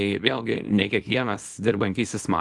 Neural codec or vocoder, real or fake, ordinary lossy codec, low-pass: codec, 24 kHz, 0.9 kbps, WavTokenizer, small release; fake; Opus, 24 kbps; 10.8 kHz